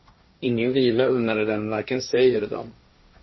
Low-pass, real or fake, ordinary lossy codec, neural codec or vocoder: 7.2 kHz; fake; MP3, 24 kbps; codec, 16 kHz, 1.1 kbps, Voila-Tokenizer